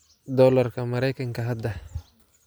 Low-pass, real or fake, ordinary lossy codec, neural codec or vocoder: none; real; none; none